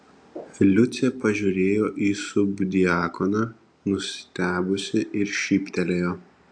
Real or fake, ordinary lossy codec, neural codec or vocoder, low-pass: real; AAC, 64 kbps; none; 9.9 kHz